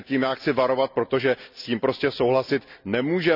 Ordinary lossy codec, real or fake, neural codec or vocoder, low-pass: none; real; none; 5.4 kHz